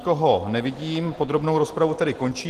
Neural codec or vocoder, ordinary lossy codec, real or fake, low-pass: none; Opus, 16 kbps; real; 14.4 kHz